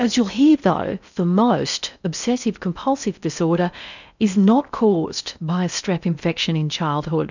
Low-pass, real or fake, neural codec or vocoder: 7.2 kHz; fake; codec, 16 kHz in and 24 kHz out, 0.6 kbps, FocalCodec, streaming, 4096 codes